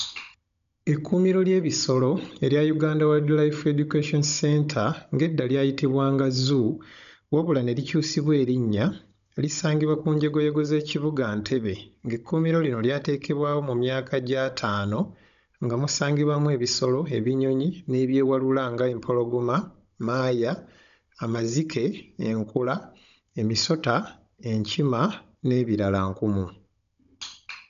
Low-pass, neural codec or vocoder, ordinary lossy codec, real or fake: 7.2 kHz; codec, 16 kHz, 16 kbps, FunCodec, trained on Chinese and English, 50 frames a second; none; fake